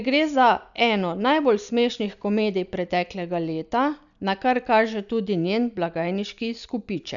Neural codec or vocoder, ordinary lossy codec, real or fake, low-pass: none; none; real; 7.2 kHz